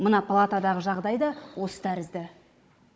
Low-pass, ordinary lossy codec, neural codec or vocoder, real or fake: none; none; codec, 16 kHz, 16 kbps, FunCodec, trained on Chinese and English, 50 frames a second; fake